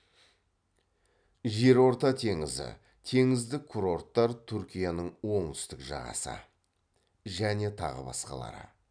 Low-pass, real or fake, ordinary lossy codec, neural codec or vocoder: 9.9 kHz; real; none; none